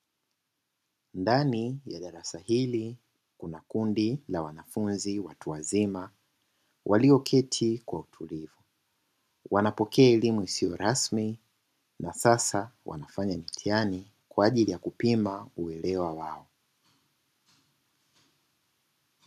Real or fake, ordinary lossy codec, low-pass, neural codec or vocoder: real; MP3, 96 kbps; 14.4 kHz; none